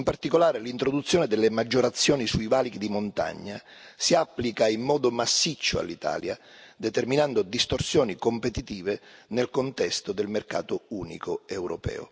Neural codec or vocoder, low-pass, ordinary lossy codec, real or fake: none; none; none; real